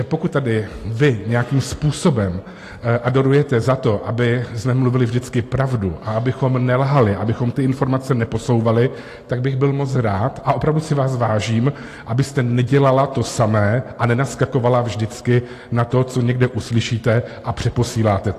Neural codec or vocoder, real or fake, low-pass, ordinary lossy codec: none; real; 14.4 kHz; AAC, 48 kbps